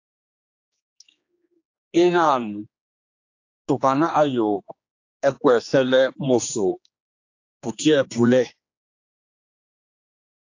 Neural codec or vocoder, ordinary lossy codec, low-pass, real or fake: codec, 16 kHz, 2 kbps, X-Codec, HuBERT features, trained on general audio; AAC, 48 kbps; 7.2 kHz; fake